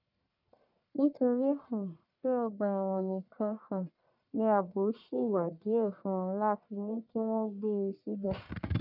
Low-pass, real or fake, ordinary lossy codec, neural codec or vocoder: 5.4 kHz; fake; none; codec, 44.1 kHz, 1.7 kbps, Pupu-Codec